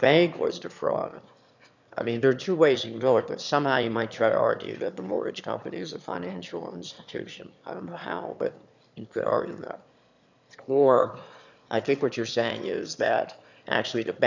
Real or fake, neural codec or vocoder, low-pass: fake; autoencoder, 22.05 kHz, a latent of 192 numbers a frame, VITS, trained on one speaker; 7.2 kHz